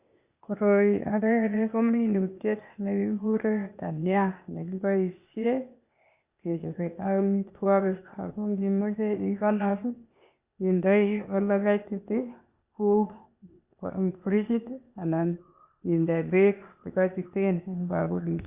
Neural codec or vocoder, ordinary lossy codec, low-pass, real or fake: codec, 16 kHz, 0.8 kbps, ZipCodec; Opus, 64 kbps; 3.6 kHz; fake